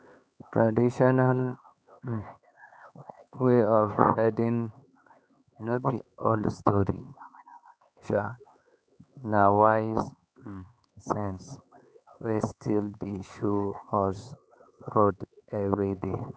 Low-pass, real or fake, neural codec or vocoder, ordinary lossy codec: none; fake; codec, 16 kHz, 4 kbps, X-Codec, HuBERT features, trained on LibriSpeech; none